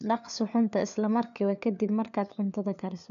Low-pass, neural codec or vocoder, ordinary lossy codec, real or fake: 7.2 kHz; codec, 16 kHz, 4 kbps, FunCodec, trained on LibriTTS, 50 frames a second; none; fake